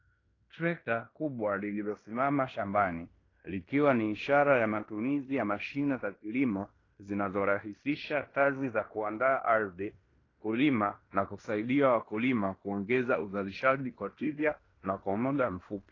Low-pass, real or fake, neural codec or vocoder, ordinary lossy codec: 7.2 kHz; fake; codec, 16 kHz in and 24 kHz out, 0.9 kbps, LongCat-Audio-Codec, fine tuned four codebook decoder; AAC, 32 kbps